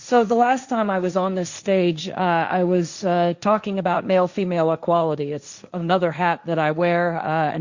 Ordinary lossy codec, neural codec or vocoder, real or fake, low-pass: Opus, 64 kbps; codec, 16 kHz, 1.1 kbps, Voila-Tokenizer; fake; 7.2 kHz